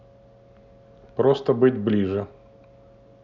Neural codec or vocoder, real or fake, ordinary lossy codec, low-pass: none; real; none; 7.2 kHz